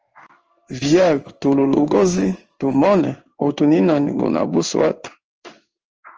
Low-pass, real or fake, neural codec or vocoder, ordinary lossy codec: 7.2 kHz; fake; codec, 16 kHz in and 24 kHz out, 1 kbps, XY-Tokenizer; Opus, 24 kbps